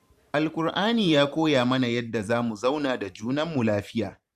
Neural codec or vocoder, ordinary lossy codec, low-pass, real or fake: vocoder, 44.1 kHz, 128 mel bands every 512 samples, BigVGAN v2; Opus, 64 kbps; 14.4 kHz; fake